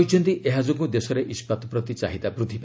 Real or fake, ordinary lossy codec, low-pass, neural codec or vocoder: real; none; none; none